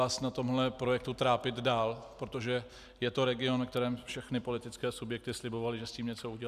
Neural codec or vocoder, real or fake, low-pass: none; real; 14.4 kHz